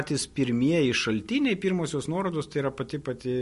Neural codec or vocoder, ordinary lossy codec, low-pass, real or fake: none; MP3, 48 kbps; 14.4 kHz; real